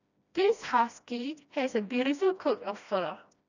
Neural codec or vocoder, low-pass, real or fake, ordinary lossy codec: codec, 16 kHz, 1 kbps, FreqCodec, smaller model; 7.2 kHz; fake; none